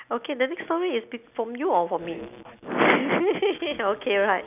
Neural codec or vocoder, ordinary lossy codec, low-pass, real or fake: none; none; 3.6 kHz; real